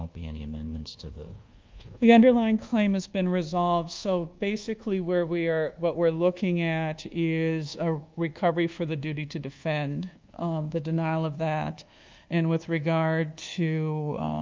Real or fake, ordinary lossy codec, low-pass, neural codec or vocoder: fake; Opus, 24 kbps; 7.2 kHz; codec, 24 kHz, 1.2 kbps, DualCodec